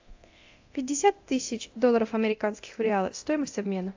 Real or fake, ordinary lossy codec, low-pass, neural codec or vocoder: fake; AAC, 48 kbps; 7.2 kHz; codec, 24 kHz, 0.9 kbps, DualCodec